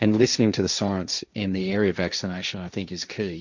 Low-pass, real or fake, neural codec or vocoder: 7.2 kHz; fake; codec, 16 kHz, 1.1 kbps, Voila-Tokenizer